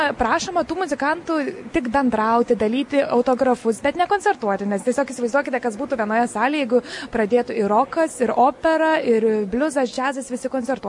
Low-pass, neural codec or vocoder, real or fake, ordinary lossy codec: 10.8 kHz; none; real; MP3, 48 kbps